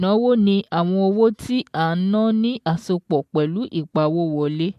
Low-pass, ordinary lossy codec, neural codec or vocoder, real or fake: 14.4 kHz; MP3, 64 kbps; none; real